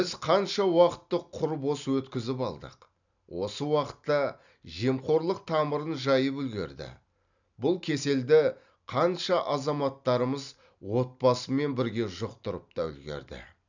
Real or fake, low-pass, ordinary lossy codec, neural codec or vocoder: real; 7.2 kHz; none; none